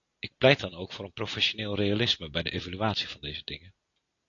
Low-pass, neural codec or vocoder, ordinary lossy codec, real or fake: 7.2 kHz; none; AAC, 48 kbps; real